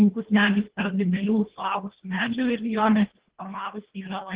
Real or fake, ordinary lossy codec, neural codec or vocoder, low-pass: fake; Opus, 16 kbps; codec, 24 kHz, 1.5 kbps, HILCodec; 3.6 kHz